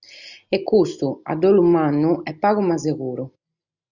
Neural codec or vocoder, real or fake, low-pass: none; real; 7.2 kHz